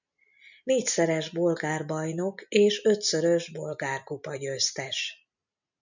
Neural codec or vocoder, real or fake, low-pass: none; real; 7.2 kHz